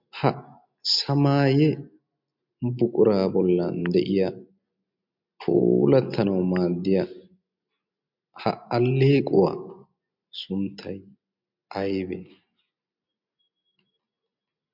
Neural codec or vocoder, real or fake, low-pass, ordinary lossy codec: none; real; 5.4 kHz; MP3, 48 kbps